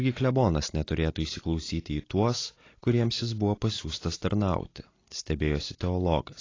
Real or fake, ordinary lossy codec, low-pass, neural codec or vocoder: real; AAC, 32 kbps; 7.2 kHz; none